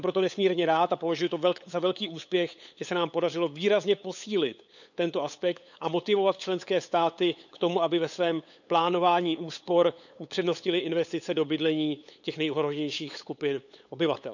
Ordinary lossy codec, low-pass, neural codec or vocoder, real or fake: none; 7.2 kHz; codec, 16 kHz, 8 kbps, FunCodec, trained on LibriTTS, 25 frames a second; fake